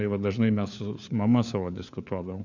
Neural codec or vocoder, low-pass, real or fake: codec, 16 kHz, 4 kbps, FunCodec, trained on LibriTTS, 50 frames a second; 7.2 kHz; fake